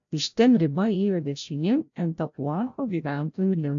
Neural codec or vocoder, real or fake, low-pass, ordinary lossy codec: codec, 16 kHz, 0.5 kbps, FreqCodec, larger model; fake; 7.2 kHz; AAC, 64 kbps